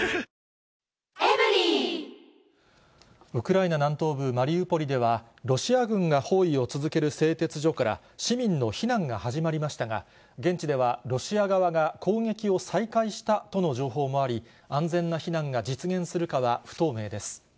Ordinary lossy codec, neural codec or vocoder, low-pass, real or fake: none; none; none; real